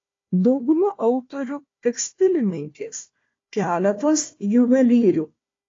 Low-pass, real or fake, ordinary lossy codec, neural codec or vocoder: 7.2 kHz; fake; AAC, 32 kbps; codec, 16 kHz, 1 kbps, FunCodec, trained on Chinese and English, 50 frames a second